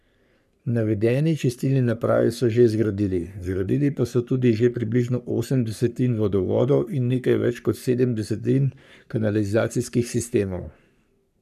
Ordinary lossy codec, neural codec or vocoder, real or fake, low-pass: none; codec, 44.1 kHz, 3.4 kbps, Pupu-Codec; fake; 14.4 kHz